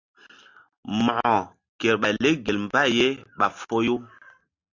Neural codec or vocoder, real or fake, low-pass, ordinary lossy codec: none; real; 7.2 kHz; AAC, 48 kbps